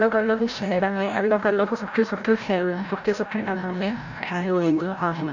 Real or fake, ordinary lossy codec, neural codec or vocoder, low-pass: fake; none; codec, 16 kHz, 0.5 kbps, FreqCodec, larger model; 7.2 kHz